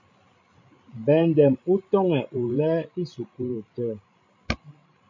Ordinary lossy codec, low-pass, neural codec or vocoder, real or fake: MP3, 64 kbps; 7.2 kHz; codec, 16 kHz, 16 kbps, FreqCodec, larger model; fake